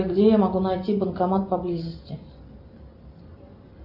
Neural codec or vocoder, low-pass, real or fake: none; 5.4 kHz; real